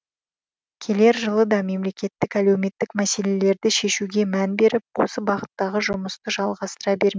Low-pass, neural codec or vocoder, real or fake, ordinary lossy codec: none; none; real; none